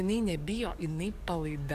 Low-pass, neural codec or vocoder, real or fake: 14.4 kHz; codec, 44.1 kHz, 7.8 kbps, DAC; fake